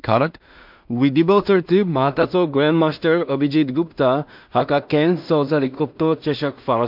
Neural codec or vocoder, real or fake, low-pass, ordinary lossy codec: codec, 16 kHz in and 24 kHz out, 0.4 kbps, LongCat-Audio-Codec, two codebook decoder; fake; 5.4 kHz; MP3, 48 kbps